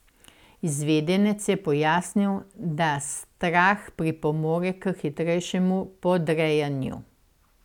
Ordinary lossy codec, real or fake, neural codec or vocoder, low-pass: none; real; none; 19.8 kHz